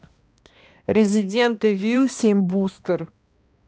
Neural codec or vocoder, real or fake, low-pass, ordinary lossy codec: codec, 16 kHz, 1 kbps, X-Codec, HuBERT features, trained on balanced general audio; fake; none; none